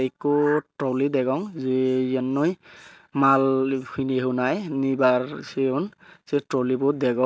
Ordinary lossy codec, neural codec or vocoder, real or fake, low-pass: none; none; real; none